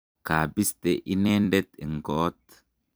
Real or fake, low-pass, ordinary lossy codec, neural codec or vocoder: fake; none; none; vocoder, 44.1 kHz, 128 mel bands every 256 samples, BigVGAN v2